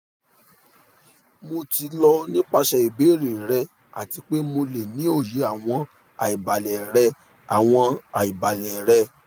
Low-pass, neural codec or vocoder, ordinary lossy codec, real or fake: none; none; none; real